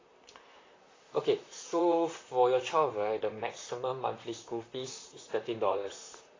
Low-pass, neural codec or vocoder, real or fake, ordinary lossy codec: 7.2 kHz; vocoder, 44.1 kHz, 128 mel bands, Pupu-Vocoder; fake; AAC, 32 kbps